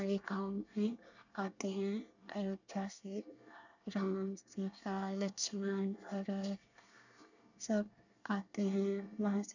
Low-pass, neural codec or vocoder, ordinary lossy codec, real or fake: 7.2 kHz; codec, 24 kHz, 1 kbps, SNAC; none; fake